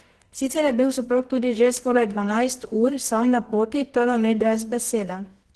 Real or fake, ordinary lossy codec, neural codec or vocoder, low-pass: fake; Opus, 16 kbps; codec, 24 kHz, 0.9 kbps, WavTokenizer, medium music audio release; 10.8 kHz